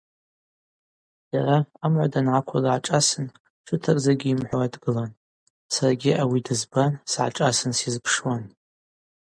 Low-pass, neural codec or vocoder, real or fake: 9.9 kHz; none; real